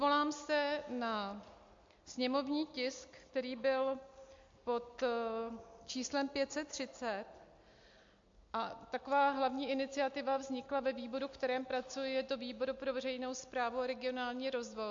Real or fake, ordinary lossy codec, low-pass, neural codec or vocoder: real; MP3, 48 kbps; 7.2 kHz; none